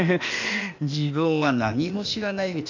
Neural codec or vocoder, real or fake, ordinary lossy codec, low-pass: codec, 16 kHz, 0.8 kbps, ZipCodec; fake; none; 7.2 kHz